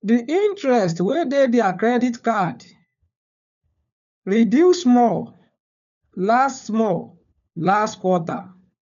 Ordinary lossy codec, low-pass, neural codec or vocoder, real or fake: none; 7.2 kHz; codec, 16 kHz, 4 kbps, FunCodec, trained on LibriTTS, 50 frames a second; fake